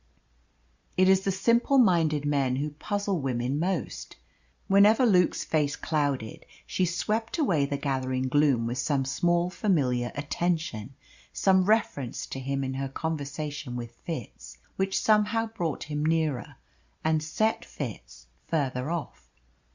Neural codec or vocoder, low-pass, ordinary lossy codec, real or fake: none; 7.2 kHz; Opus, 64 kbps; real